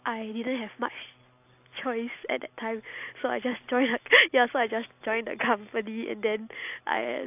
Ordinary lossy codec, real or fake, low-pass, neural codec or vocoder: AAC, 32 kbps; real; 3.6 kHz; none